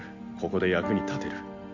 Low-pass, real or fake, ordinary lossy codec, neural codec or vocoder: 7.2 kHz; real; MP3, 48 kbps; none